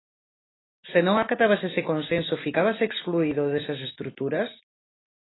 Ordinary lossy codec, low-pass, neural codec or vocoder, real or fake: AAC, 16 kbps; 7.2 kHz; none; real